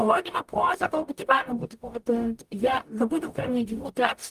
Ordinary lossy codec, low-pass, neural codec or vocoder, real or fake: Opus, 32 kbps; 14.4 kHz; codec, 44.1 kHz, 0.9 kbps, DAC; fake